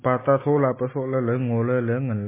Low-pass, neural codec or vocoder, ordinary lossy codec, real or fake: 3.6 kHz; none; MP3, 16 kbps; real